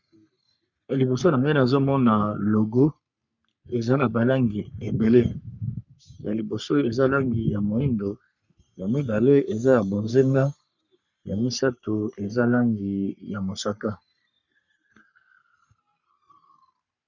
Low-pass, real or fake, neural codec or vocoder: 7.2 kHz; fake; codec, 44.1 kHz, 3.4 kbps, Pupu-Codec